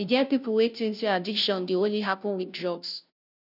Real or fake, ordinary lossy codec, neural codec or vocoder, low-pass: fake; none; codec, 16 kHz, 0.5 kbps, FunCodec, trained on Chinese and English, 25 frames a second; 5.4 kHz